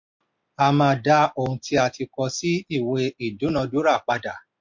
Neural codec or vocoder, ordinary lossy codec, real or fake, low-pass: none; MP3, 48 kbps; real; 7.2 kHz